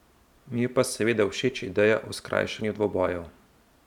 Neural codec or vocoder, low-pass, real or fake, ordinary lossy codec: vocoder, 44.1 kHz, 128 mel bands every 512 samples, BigVGAN v2; 19.8 kHz; fake; none